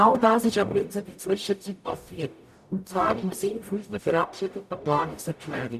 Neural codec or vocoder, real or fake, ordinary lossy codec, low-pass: codec, 44.1 kHz, 0.9 kbps, DAC; fake; none; 14.4 kHz